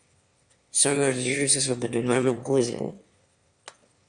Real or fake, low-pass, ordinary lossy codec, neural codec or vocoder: fake; 9.9 kHz; Opus, 64 kbps; autoencoder, 22.05 kHz, a latent of 192 numbers a frame, VITS, trained on one speaker